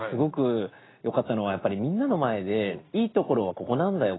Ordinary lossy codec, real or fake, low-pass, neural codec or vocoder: AAC, 16 kbps; real; 7.2 kHz; none